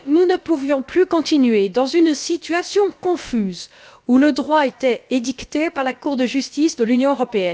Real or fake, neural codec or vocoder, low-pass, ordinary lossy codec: fake; codec, 16 kHz, about 1 kbps, DyCAST, with the encoder's durations; none; none